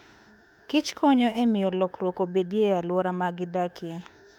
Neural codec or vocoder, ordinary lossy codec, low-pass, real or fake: autoencoder, 48 kHz, 32 numbers a frame, DAC-VAE, trained on Japanese speech; none; 19.8 kHz; fake